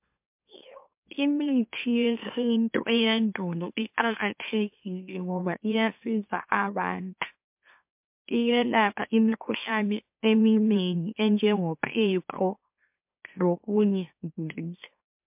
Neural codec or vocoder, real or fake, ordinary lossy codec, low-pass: autoencoder, 44.1 kHz, a latent of 192 numbers a frame, MeloTTS; fake; MP3, 32 kbps; 3.6 kHz